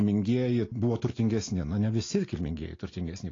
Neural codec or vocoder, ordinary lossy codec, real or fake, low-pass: none; AAC, 32 kbps; real; 7.2 kHz